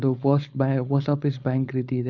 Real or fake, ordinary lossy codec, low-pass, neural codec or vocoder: fake; none; 7.2 kHz; codec, 16 kHz, 4.8 kbps, FACodec